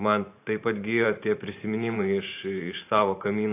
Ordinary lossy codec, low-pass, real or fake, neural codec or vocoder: AAC, 24 kbps; 3.6 kHz; fake; vocoder, 44.1 kHz, 128 mel bands every 512 samples, BigVGAN v2